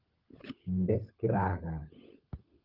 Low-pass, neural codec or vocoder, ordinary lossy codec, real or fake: 5.4 kHz; codec, 16 kHz, 16 kbps, FunCodec, trained on LibriTTS, 50 frames a second; Opus, 24 kbps; fake